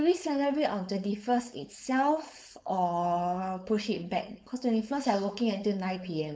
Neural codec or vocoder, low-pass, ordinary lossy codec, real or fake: codec, 16 kHz, 4.8 kbps, FACodec; none; none; fake